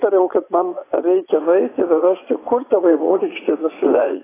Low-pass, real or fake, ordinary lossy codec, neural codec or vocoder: 3.6 kHz; fake; AAC, 16 kbps; vocoder, 44.1 kHz, 80 mel bands, Vocos